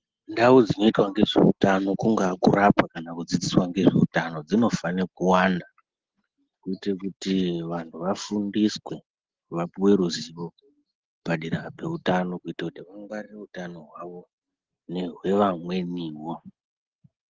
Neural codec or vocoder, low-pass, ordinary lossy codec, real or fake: none; 7.2 kHz; Opus, 16 kbps; real